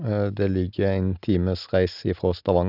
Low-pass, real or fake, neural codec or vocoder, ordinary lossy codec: 5.4 kHz; real; none; none